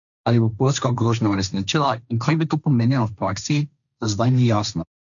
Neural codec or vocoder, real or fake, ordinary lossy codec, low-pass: codec, 16 kHz, 1.1 kbps, Voila-Tokenizer; fake; none; 7.2 kHz